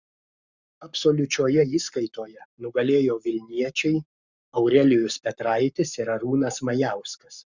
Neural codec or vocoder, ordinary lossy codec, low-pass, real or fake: codec, 44.1 kHz, 7.8 kbps, Pupu-Codec; Opus, 64 kbps; 7.2 kHz; fake